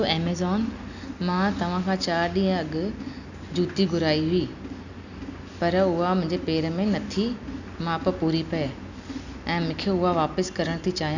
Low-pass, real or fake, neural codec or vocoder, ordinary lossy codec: 7.2 kHz; real; none; none